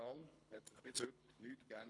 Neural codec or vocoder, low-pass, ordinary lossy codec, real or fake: codec, 24 kHz, 3 kbps, HILCodec; 10.8 kHz; none; fake